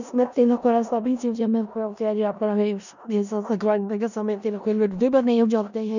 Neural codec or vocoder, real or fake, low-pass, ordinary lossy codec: codec, 16 kHz in and 24 kHz out, 0.4 kbps, LongCat-Audio-Codec, four codebook decoder; fake; 7.2 kHz; none